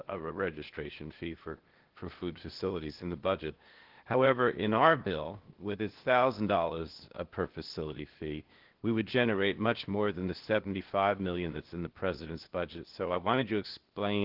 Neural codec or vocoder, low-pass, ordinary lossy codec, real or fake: codec, 16 kHz, 0.8 kbps, ZipCodec; 5.4 kHz; Opus, 16 kbps; fake